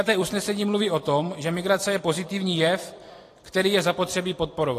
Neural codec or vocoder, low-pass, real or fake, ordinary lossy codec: vocoder, 44.1 kHz, 128 mel bands every 256 samples, BigVGAN v2; 14.4 kHz; fake; AAC, 48 kbps